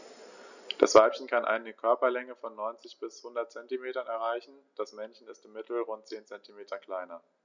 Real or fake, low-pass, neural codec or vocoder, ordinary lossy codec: real; 7.2 kHz; none; none